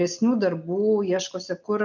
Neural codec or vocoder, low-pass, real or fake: none; 7.2 kHz; real